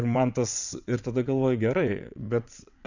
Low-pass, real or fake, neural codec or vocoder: 7.2 kHz; fake; vocoder, 22.05 kHz, 80 mel bands, WaveNeXt